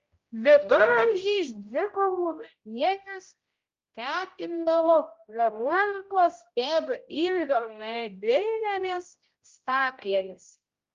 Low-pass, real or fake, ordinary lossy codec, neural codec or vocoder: 7.2 kHz; fake; Opus, 32 kbps; codec, 16 kHz, 0.5 kbps, X-Codec, HuBERT features, trained on general audio